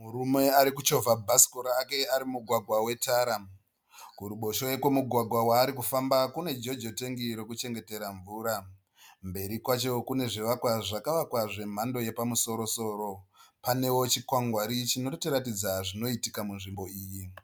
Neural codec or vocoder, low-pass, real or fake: none; 19.8 kHz; real